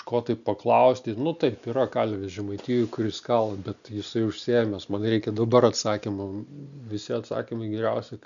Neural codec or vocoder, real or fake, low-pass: none; real; 7.2 kHz